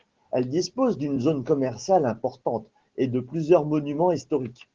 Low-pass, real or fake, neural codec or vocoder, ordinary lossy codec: 7.2 kHz; real; none; Opus, 16 kbps